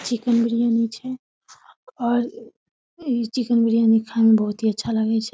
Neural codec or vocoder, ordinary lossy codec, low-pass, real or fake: none; none; none; real